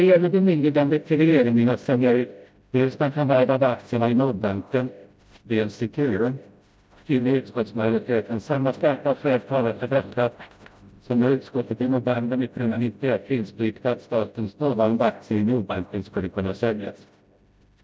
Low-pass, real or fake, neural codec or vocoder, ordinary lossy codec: none; fake; codec, 16 kHz, 0.5 kbps, FreqCodec, smaller model; none